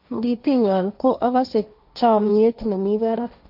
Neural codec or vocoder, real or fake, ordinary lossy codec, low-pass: codec, 16 kHz, 1.1 kbps, Voila-Tokenizer; fake; none; 5.4 kHz